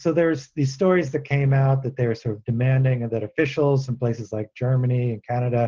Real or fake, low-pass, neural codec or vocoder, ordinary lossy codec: real; 7.2 kHz; none; Opus, 16 kbps